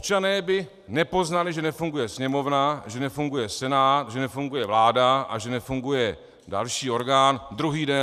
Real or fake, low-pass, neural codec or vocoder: fake; 14.4 kHz; vocoder, 44.1 kHz, 128 mel bands every 512 samples, BigVGAN v2